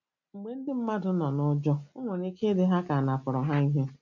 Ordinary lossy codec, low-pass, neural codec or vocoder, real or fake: none; 7.2 kHz; none; real